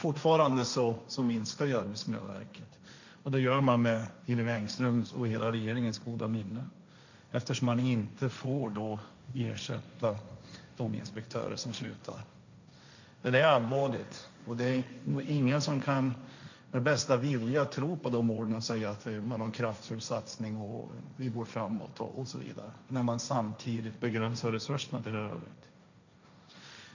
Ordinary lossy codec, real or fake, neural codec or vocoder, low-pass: none; fake; codec, 16 kHz, 1.1 kbps, Voila-Tokenizer; 7.2 kHz